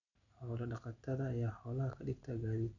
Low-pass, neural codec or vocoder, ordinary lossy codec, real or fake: 7.2 kHz; none; none; real